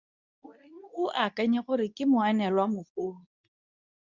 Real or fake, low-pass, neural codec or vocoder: fake; 7.2 kHz; codec, 24 kHz, 0.9 kbps, WavTokenizer, medium speech release version 2